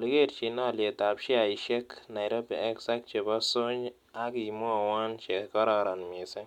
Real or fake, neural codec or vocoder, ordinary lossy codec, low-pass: real; none; MP3, 96 kbps; 19.8 kHz